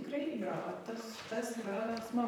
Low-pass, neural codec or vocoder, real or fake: 19.8 kHz; vocoder, 44.1 kHz, 128 mel bands, Pupu-Vocoder; fake